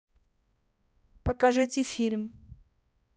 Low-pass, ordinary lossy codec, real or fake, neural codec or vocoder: none; none; fake; codec, 16 kHz, 1 kbps, X-Codec, HuBERT features, trained on balanced general audio